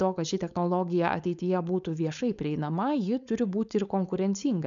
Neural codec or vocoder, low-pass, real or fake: codec, 16 kHz, 4.8 kbps, FACodec; 7.2 kHz; fake